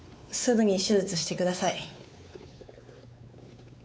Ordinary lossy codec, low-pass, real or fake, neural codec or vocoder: none; none; fake; codec, 16 kHz, 4 kbps, X-Codec, WavLM features, trained on Multilingual LibriSpeech